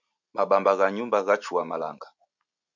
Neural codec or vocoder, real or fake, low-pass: none; real; 7.2 kHz